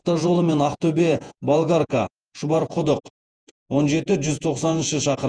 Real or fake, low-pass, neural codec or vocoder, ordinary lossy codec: fake; 9.9 kHz; vocoder, 48 kHz, 128 mel bands, Vocos; Opus, 32 kbps